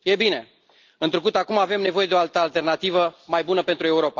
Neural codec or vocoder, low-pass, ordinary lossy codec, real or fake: none; 7.2 kHz; Opus, 32 kbps; real